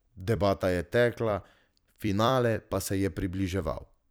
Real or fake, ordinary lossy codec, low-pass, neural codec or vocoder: fake; none; none; vocoder, 44.1 kHz, 128 mel bands every 256 samples, BigVGAN v2